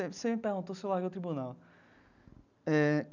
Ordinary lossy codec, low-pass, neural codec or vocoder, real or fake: none; 7.2 kHz; none; real